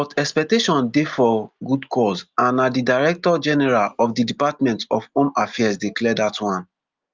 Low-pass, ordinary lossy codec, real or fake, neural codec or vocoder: 7.2 kHz; Opus, 24 kbps; real; none